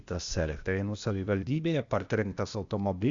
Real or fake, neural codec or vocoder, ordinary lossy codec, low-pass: fake; codec, 16 kHz, 0.8 kbps, ZipCodec; Opus, 64 kbps; 7.2 kHz